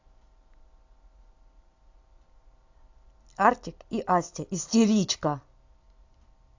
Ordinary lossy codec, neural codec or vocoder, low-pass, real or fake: AAC, 48 kbps; none; 7.2 kHz; real